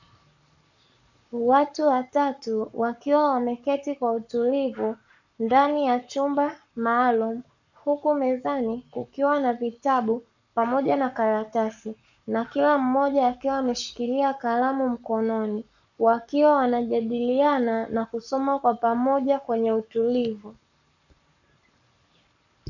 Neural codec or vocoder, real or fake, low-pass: codec, 44.1 kHz, 7.8 kbps, Pupu-Codec; fake; 7.2 kHz